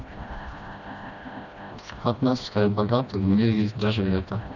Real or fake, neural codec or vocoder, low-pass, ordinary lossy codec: fake; codec, 16 kHz, 1 kbps, FreqCodec, smaller model; 7.2 kHz; none